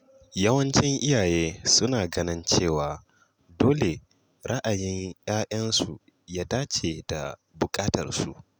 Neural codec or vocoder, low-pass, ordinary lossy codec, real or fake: none; none; none; real